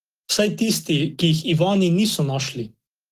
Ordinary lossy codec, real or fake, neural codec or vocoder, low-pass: Opus, 16 kbps; real; none; 14.4 kHz